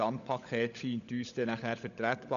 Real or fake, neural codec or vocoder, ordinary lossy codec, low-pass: fake; codec, 16 kHz, 16 kbps, FunCodec, trained on LibriTTS, 50 frames a second; none; 7.2 kHz